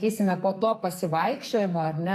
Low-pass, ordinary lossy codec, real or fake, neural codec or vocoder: 14.4 kHz; AAC, 64 kbps; fake; codec, 44.1 kHz, 2.6 kbps, SNAC